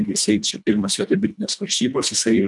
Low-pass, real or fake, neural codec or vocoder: 10.8 kHz; fake; codec, 24 kHz, 1.5 kbps, HILCodec